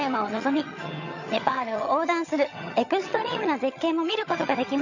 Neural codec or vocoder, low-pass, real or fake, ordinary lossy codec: vocoder, 22.05 kHz, 80 mel bands, HiFi-GAN; 7.2 kHz; fake; none